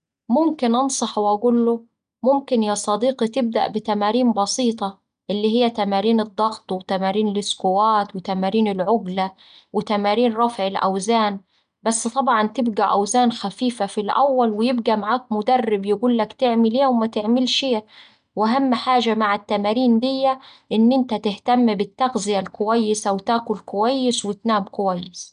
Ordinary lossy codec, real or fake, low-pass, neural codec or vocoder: none; real; 9.9 kHz; none